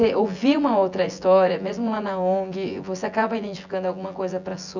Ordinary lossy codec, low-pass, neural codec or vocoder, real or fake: none; 7.2 kHz; vocoder, 24 kHz, 100 mel bands, Vocos; fake